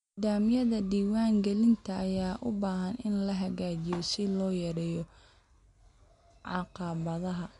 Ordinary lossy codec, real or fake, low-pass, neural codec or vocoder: MP3, 64 kbps; real; 10.8 kHz; none